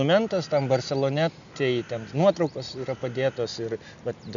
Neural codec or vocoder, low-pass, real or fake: none; 7.2 kHz; real